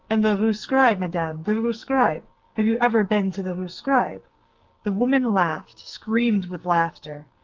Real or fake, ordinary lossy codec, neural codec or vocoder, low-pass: fake; Opus, 32 kbps; codec, 44.1 kHz, 2.6 kbps, SNAC; 7.2 kHz